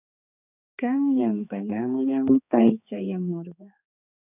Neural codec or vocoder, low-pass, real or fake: codec, 16 kHz, 4 kbps, X-Codec, HuBERT features, trained on balanced general audio; 3.6 kHz; fake